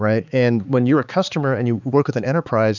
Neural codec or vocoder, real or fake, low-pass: codec, 16 kHz, 4 kbps, X-Codec, HuBERT features, trained on balanced general audio; fake; 7.2 kHz